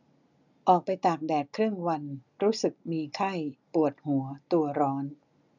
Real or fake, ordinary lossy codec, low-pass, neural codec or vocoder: real; none; 7.2 kHz; none